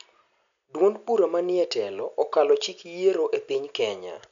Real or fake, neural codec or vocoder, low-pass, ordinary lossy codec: real; none; 7.2 kHz; MP3, 64 kbps